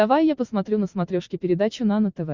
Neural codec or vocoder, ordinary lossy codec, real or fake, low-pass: none; Opus, 64 kbps; real; 7.2 kHz